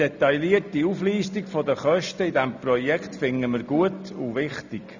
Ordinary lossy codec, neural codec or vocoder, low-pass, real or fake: none; none; 7.2 kHz; real